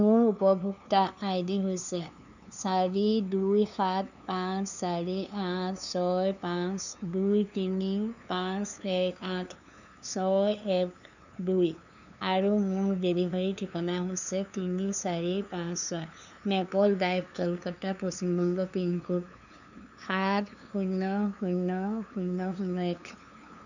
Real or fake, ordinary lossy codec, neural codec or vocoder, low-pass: fake; none; codec, 16 kHz, 2 kbps, FunCodec, trained on LibriTTS, 25 frames a second; 7.2 kHz